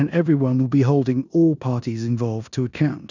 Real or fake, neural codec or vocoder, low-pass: fake; codec, 16 kHz in and 24 kHz out, 0.9 kbps, LongCat-Audio-Codec, four codebook decoder; 7.2 kHz